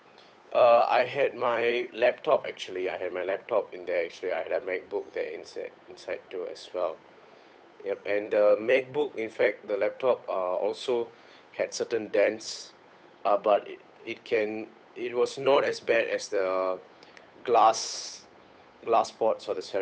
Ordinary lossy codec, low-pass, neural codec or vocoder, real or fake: none; none; codec, 16 kHz, 8 kbps, FunCodec, trained on Chinese and English, 25 frames a second; fake